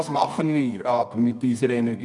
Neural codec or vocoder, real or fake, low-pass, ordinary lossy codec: codec, 24 kHz, 0.9 kbps, WavTokenizer, medium music audio release; fake; 10.8 kHz; none